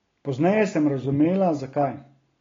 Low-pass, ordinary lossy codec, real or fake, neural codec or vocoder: 7.2 kHz; AAC, 32 kbps; real; none